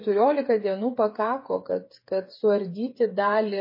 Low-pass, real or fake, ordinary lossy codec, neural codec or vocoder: 5.4 kHz; fake; MP3, 24 kbps; codec, 16 kHz, 8 kbps, FreqCodec, smaller model